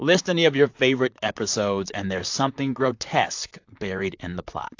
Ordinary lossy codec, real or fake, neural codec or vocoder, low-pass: AAC, 48 kbps; fake; vocoder, 44.1 kHz, 128 mel bands, Pupu-Vocoder; 7.2 kHz